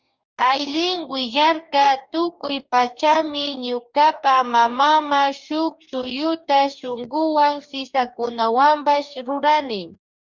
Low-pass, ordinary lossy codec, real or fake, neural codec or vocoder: 7.2 kHz; Opus, 64 kbps; fake; codec, 44.1 kHz, 2.6 kbps, DAC